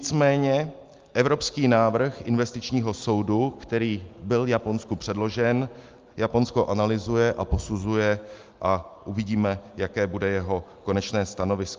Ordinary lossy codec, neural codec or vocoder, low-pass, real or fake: Opus, 32 kbps; none; 7.2 kHz; real